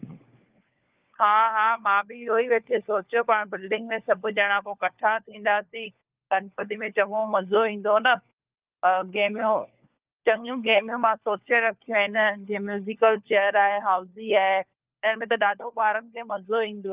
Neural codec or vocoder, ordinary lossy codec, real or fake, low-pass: codec, 16 kHz, 4 kbps, FunCodec, trained on LibriTTS, 50 frames a second; Opus, 24 kbps; fake; 3.6 kHz